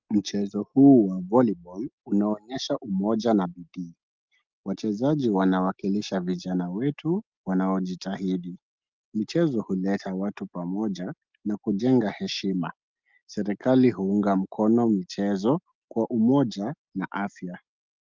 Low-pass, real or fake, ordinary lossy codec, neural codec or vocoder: 7.2 kHz; real; Opus, 32 kbps; none